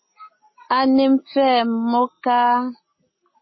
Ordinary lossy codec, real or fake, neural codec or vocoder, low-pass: MP3, 24 kbps; real; none; 7.2 kHz